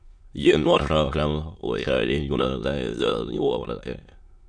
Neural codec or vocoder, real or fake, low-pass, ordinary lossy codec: autoencoder, 22.05 kHz, a latent of 192 numbers a frame, VITS, trained on many speakers; fake; 9.9 kHz; MP3, 96 kbps